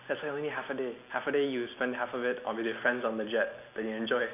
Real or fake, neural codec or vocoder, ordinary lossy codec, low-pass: real; none; none; 3.6 kHz